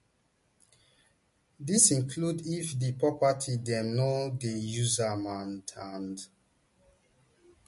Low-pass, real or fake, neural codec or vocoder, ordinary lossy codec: 14.4 kHz; real; none; MP3, 48 kbps